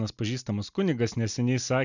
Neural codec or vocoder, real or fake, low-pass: none; real; 7.2 kHz